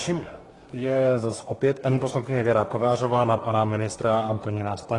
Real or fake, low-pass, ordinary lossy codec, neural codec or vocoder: fake; 10.8 kHz; AAC, 32 kbps; codec, 24 kHz, 1 kbps, SNAC